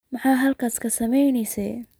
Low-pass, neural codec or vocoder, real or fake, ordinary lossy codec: none; none; real; none